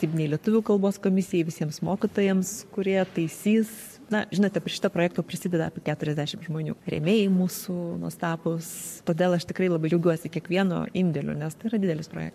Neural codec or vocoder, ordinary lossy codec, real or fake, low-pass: codec, 44.1 kHz, 7.8 kbps, Pupu-Codec; MP3, 64 kbps; fake; 14.4 kHz